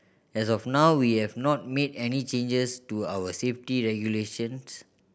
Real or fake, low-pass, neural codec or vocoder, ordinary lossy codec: real; none; none; none